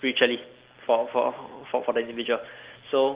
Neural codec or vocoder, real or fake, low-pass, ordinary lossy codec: none; real; 3.6 kHz; Opus, 16 kbps